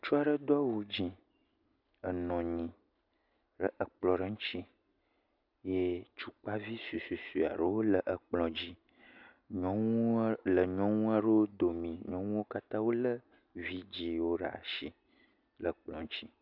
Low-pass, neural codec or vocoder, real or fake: 5.4 kHz; none; real